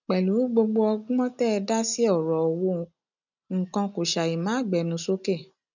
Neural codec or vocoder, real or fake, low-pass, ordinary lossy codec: none; real; 7.2 kHz; none